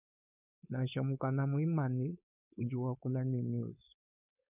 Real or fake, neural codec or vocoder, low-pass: fake; codec, 16 kHz, 4.8 kbps, FACodec; 3.6 kHz